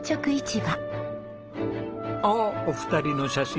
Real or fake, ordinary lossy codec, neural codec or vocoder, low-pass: real; Opus, 16 kbps; none; 7.2 kHz